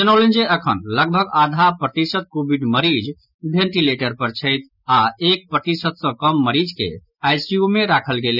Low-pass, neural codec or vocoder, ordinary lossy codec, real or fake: 5.4 kHz; none; none; real